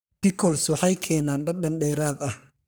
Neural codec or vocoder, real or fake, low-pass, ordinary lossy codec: codec, 44.1 kHz, 3.4 kbps, Pupu-Codec; fake; none; none